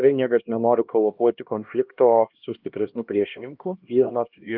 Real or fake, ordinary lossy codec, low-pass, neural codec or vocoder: fake; Opus, 24 kbps; 5.4 kHz; codec, 16 kHz, 1 kbps, X-Codec, HuBERT features, trained on LibriSpeech